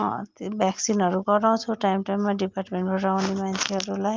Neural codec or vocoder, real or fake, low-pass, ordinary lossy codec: none; real; 7.2 kHz; Opus, 24 kbps